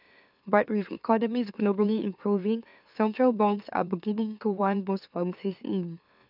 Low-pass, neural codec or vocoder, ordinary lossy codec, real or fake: 5.4 kHz; autoencoder, 44.1 kHz, a latent of 192 numbers a frame, MeloTTS; none; fake